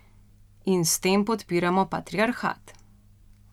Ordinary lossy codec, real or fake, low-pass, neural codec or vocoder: none; real; 19.8 kHz; none